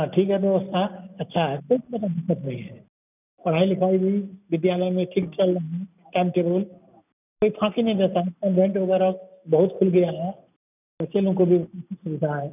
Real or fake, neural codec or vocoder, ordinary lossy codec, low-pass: real; none; none; 3.6 kHz